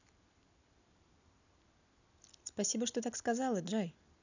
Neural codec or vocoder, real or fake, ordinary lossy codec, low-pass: none; real; none; 7.2 kHz